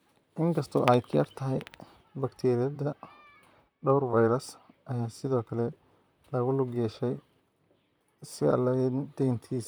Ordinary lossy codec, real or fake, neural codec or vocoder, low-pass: none; fake; vocoder, 44.1 kHz, 128 mel bands, Pupu-Vocoder; none